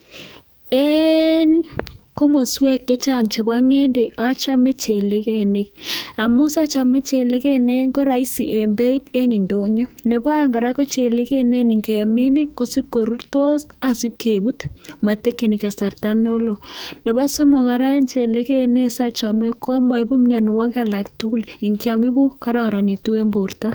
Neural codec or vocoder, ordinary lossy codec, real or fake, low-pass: codec, 44.1 kHz, 2.6 kbps, SNAC; none; fake; none